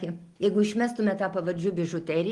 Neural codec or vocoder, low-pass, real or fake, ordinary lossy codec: none; 10.8 kHz; real; Opus, 24 kbps